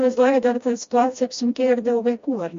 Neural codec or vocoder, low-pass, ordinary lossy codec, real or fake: codec, 16 kHz, 1 kbps, FreqCodec, smaller model; 7.2 kHz; MP3, 48 kbps; fake